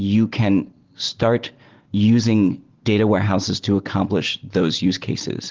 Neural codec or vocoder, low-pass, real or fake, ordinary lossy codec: none; 7.2 kHz; real; Opus, 16 kbps